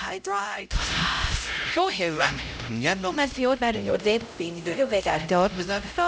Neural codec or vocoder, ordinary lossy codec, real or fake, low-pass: codec, 16 kHz, 0.5 kbps, X-Codec, HuBERT features, trained on LibriSpeech; none; fake; none